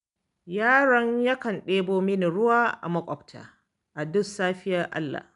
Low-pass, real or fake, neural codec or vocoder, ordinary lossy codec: 10.8 kHz; real; none; none